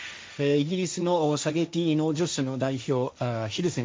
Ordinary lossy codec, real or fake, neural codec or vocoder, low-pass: none; fake; codec, 16 kHz, 1.1 kbps, Voila-Tokenizer; none